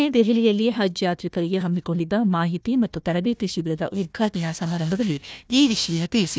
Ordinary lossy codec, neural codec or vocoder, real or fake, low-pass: none; codec, 16 kHz, 1 kbps, FunCodec, trained on Chinese and English, 50 frames a second; fake; none